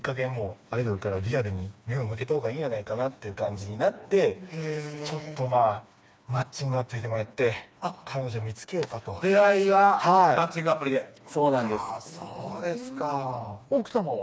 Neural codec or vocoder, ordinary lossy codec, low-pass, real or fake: codec, 16 kHz, 2 kbps, FreqCodec, smaller model; none; none; fake